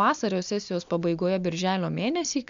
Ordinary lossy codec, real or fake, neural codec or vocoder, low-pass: MP3, 64 kbps; real; none; 7.2 kHz